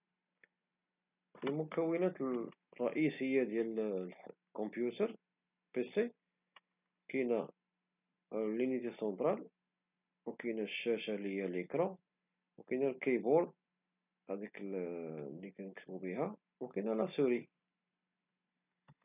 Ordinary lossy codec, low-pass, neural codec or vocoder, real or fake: none; 3.6 kHz; none; real